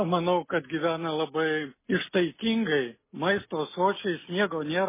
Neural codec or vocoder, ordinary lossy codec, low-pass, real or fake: none; MP3, 16 kbps; 3.6 kHz; real